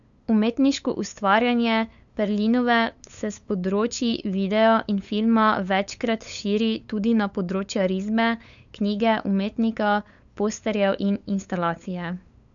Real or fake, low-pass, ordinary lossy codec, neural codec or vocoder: fake; 7.2 kHz; none; codec, 16 kHz, 8 kbps, FunCodec, trained on LibriTTS, 25 frames a second